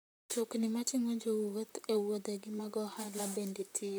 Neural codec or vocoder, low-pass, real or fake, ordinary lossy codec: vocoder, 44.1 kHz, 128 mel bands, Pupu-Vocoder; none; fake; none